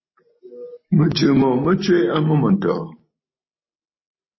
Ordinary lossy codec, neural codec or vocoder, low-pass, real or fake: MP3, 24 kbps; none; 7.2 kHz; real